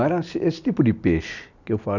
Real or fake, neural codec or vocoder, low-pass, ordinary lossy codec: real; none; 7.2 kHz; none